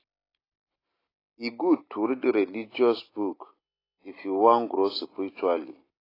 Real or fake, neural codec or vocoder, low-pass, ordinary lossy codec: real; none; 5.4 kHz; AAC, 24 kbps